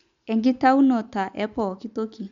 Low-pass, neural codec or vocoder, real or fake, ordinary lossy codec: 7.2 kHz; none; real; MP3, 64 kbps